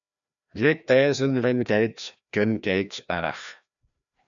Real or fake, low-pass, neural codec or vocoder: fake; 7.2 kHz; codec, 16 kHz, 1 kbps, FreqCodec, larger model